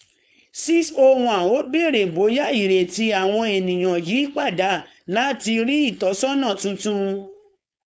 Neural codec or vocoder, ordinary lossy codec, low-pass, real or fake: codec, 16 kHz, 4.8 kbps, FACodec; none; none; fake